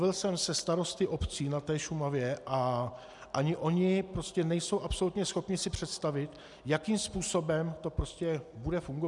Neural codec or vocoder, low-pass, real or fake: none; 10.8 kHz; real